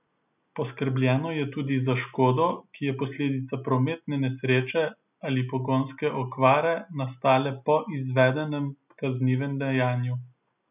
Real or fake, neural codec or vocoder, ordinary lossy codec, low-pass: real; none; none; 3.6 kHz